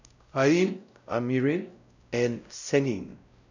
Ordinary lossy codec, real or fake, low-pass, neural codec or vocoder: none; fake; 7.2 kHz; codec, 16 kHz, 0.5 kbps, X-Codec, WavLM features, trained on Multilingual LibriSpeech